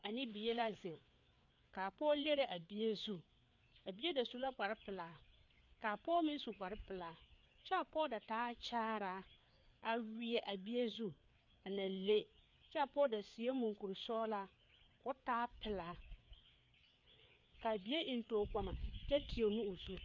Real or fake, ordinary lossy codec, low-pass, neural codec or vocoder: fake; MP3, 64 kbps; 7.2 kHz; codec, 16 kHz, 4 kbps, FreqCodec, larger model